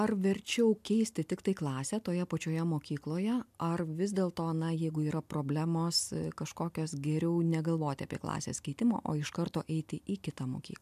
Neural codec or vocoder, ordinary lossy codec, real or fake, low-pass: none; MP3, 96 kbps; real; 14.4 kHz